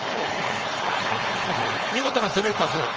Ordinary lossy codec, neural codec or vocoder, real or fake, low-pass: Opus, 24 kbps; codec, 16 kHz, 4.8 kbps, FACodec; fake; 7.2 kHz